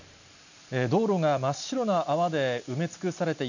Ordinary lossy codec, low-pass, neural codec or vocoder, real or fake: none; 7.2 kHz; none; real